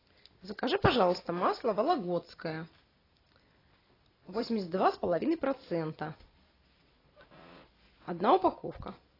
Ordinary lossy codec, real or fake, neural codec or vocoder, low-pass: AAC, 24 kbps; real; none; 5.4 kHz